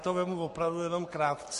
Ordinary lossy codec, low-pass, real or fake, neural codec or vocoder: MP3, 48 kbps; 14.4 kHz; fake; codec, 44.1 kHz, 7.8 kbps, Pupu-Codec